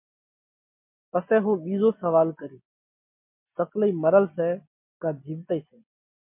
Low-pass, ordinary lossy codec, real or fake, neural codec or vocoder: 3.6 kHz; MP3, 32 kbps; real; none